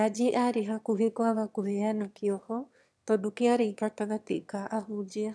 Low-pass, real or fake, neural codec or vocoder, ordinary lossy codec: none; fake; autoencoder, 22.05 kHz, a latent of 192 numbers a frame, VITS, trained on one speaker; none